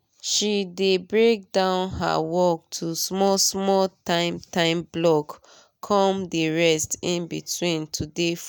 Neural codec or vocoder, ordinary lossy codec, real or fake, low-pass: none; none; real; none